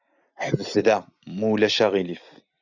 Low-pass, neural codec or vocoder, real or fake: 7.2 kHz; none; real